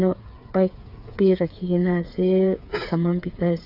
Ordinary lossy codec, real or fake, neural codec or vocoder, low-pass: none; fake; codec, 16 kHz, 8 kbps, FreqCodec, smaller model; 5.4 kHz